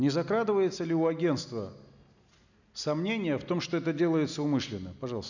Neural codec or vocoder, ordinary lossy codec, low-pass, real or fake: none; none; 7.2 kHz; real